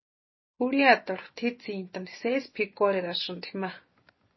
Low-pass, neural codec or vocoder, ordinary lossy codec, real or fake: 7.2 kHz; none; MP3, 24 kbps; real